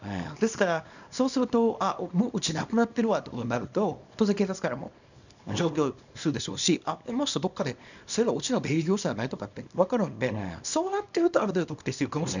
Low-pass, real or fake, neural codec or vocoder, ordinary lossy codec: 7.2 kHz; fake; codec, 24 kHz, 0.9 kbps, WavTokenizer, small release; none